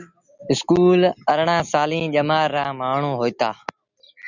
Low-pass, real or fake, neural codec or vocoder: 7.2 kHz; real; none